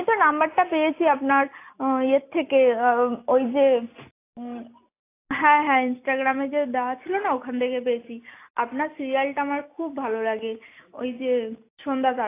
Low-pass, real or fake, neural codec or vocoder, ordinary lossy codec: 3.6 kHz; real; none; AAC, 24 kbps